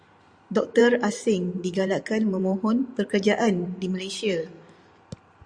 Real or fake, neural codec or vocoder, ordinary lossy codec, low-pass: fake; vocoder, 44.1 kHz, 128 mel bands every 512 samples, BigVGAN v2; Opus, 64 kbps; 9.9 kHz